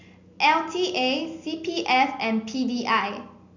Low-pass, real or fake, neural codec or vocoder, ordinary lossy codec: 7.2 kHz; real; none; none